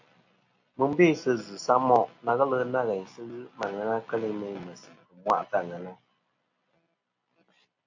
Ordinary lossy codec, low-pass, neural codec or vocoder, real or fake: AAC, 48 kbps; 7.2 kHz; none; real